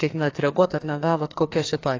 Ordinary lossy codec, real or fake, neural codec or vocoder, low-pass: AAC, 32 kbps; fake; codec, 44.1 kHz, 2.6 kbps, SNAC; 7.2 kHz